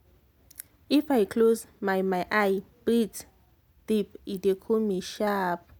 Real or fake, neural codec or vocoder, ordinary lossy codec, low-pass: real; none; none; none